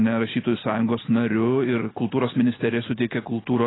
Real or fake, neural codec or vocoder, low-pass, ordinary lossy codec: real; none; 7.2 kHz; AAC, 16 kbps